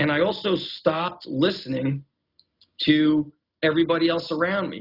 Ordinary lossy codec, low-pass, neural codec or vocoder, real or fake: Opus, 64 kbps; 5.4 kHz; none; real